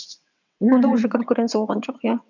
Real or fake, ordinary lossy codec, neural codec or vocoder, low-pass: fake; none; vocoder, 22.05 kHz, 80 mel bands, WaveNeXt; 7.2 kHz